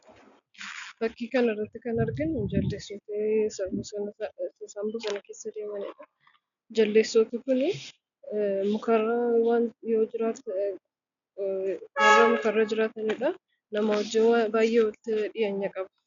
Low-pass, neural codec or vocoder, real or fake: 7.2 kHz; none; real